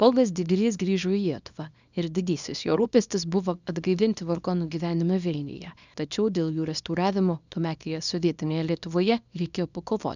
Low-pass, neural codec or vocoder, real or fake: 7.2 kHz; codec, 24 kHz, 0.9 kbps, WavTokenizer, small release; fake